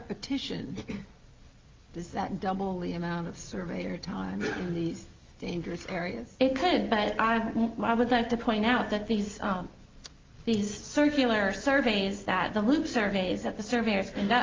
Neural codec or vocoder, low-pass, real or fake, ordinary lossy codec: none; 7.2 kHz; real; Opus, 32 kbps